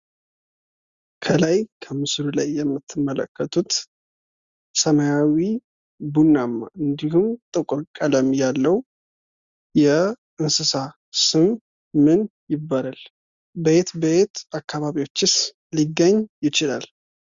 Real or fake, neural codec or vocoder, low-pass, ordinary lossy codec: real; none; 7.2 kHz; Opus, 64 kbps